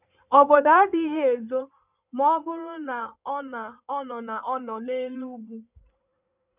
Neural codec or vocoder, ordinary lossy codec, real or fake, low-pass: codec, 16 kHz in and 24 kHz out, 2.2 kbps, FireRedTTS-2 codec; none; fake; 3.6 kHz